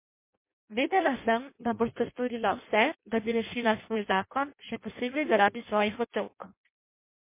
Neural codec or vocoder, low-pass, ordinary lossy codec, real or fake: codec, 16 kHz in and 24 kHz out, 0.6 kbps, FireRedTTS-2 codec; 3.6 kHz; MP3, 24 kbps; fake